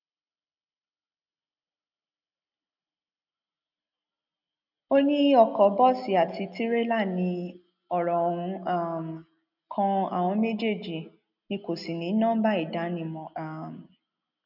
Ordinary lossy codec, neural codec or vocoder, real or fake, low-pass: none; none; real; 5.4 kHz